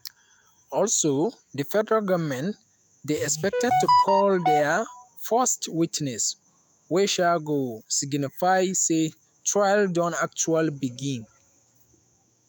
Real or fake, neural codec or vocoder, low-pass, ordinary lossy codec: fake; autoencoder, 48 kHz, 128 numbers a frame, DAC-VAE, trained on Japanese speech; none; none